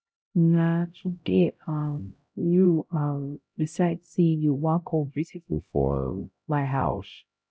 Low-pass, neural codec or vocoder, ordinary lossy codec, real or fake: none; codec, 16 kHz, 0.5 kbps, X-Codec, HuBERT features, trained on LibriSpeech; none; fake